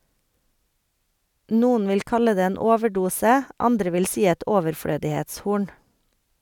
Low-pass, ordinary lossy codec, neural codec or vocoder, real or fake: 19.8 kHz; none; none; real